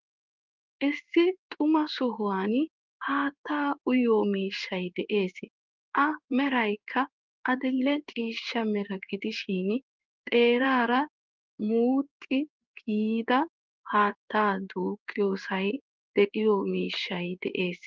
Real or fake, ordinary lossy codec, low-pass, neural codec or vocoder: fake; Opus, 24 kbps; 7.2 kHz; codec, 16 kHz in and 24 kHz out, 1 kbps, XY-Tokenizer